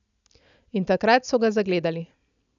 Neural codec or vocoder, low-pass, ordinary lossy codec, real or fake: none; 7.2 kHz; none; real